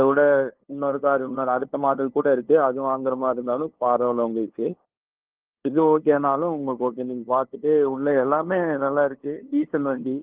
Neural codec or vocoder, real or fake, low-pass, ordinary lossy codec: codec, 16 kHz, 2 kbps, FunCodec, trained on LibriTTS, 25 frames a second; fake; 3.6 kHz; Opus, 16 kbps